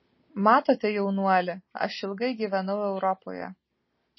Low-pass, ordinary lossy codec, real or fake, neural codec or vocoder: 7.2 kHz; MP3, 24 kbps; real; none